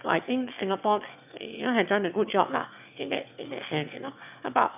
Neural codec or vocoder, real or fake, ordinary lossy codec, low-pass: autoencoder, 22.05 kHz, a latent of 192 numbers a frame, VITS, trained on one speaker; fake; none; 3.6 kHz